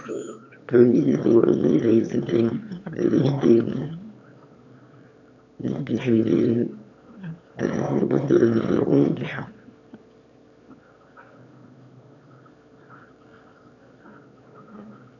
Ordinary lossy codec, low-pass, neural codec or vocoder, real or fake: none; 7.2 kHz; autoencoder, 22.05 kHz, a latent of 192 numbers a frame, VITS, trained on one speaker; fake